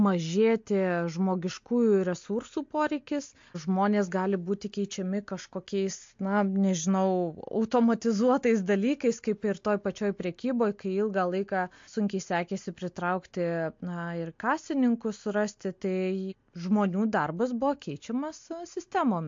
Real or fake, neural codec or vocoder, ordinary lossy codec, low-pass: real; none; MP3, 48 kbps; 7.2 kHz